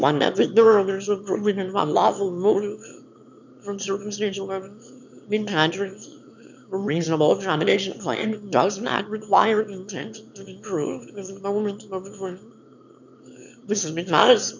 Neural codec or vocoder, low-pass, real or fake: autoencoder, 22.05 kHz, a latent of 192 numbers a frame, VITS, trained on one speaker; 7.2 kHz; fake